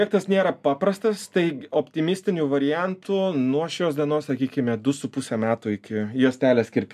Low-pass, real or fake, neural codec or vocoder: 14.4 kHz; real; none